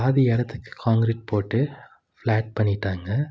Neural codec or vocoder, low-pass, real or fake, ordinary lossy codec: none; none; real; none